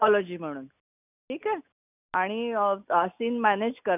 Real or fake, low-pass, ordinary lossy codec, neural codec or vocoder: real; 3.6 kHz; none; none